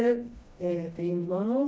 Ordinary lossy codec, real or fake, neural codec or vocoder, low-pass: none; fake; codec, 16 kHz, 1 kbps, FreqCodec, smaller model; none